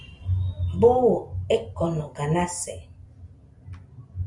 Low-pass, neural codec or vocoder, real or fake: 10.8 kHz; none; real